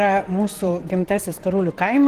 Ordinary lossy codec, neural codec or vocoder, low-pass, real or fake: Opus, 16 kbps; vocoder, 44.1 kHz, 128 mel bands, Pupu-Vocoder; 14.4 kHz; fake